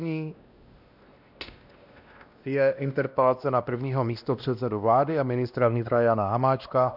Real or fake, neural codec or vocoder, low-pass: fake; codec, 16 kHz, 1 kbps, X-Codec, WavLM features, trained on Multilingual LibriSpeech; 5.4 kHz